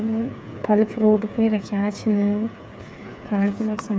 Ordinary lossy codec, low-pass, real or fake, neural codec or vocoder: none; none; fake; codec, 16 kHz, 8 kbps, FreqCodec, smaller model